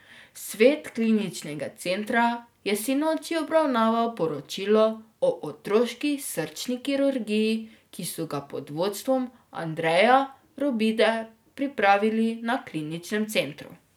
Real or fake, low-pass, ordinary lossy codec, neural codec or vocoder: real; none; none; none